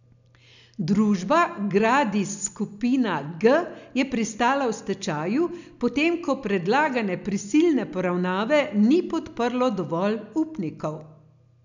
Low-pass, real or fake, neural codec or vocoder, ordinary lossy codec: 7.2 kHz; real; none; none